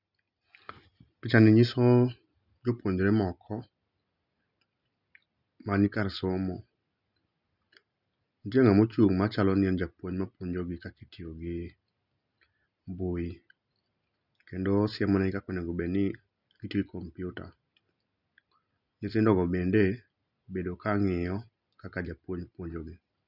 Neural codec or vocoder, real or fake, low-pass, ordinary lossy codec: none; real; 5.4 kHz; none